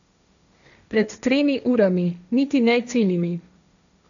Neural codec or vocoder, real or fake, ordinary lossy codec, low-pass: codec, 16 kHz, 1.1 kbps, Voila-Tokenizer; fake; none; 7.2 kHz